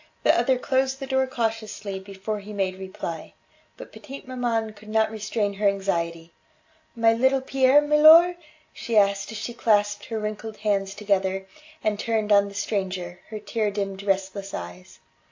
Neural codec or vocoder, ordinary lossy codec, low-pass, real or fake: none; AAC, 48 kbps; 7.2 kHz; real